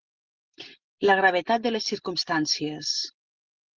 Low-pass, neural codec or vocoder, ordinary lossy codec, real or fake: 7.2 kHz; none; Opus, 16 kbps; real